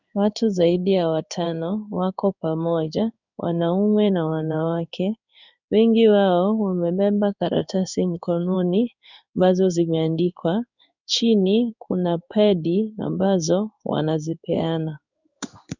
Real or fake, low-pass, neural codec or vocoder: fake; 7.2 kHz; codec, 16 kHz in and 24 kHz out, 1 kbps, XY-Tokenizer